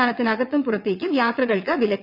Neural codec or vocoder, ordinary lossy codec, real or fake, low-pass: vocoder, 22.05 kHz, 80 mel bands, WaveNeXt; none; fake; 5.4 kHz